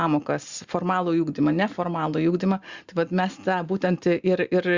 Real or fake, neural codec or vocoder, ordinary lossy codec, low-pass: real; none; Opus, 64 kbps; 7.2 kHz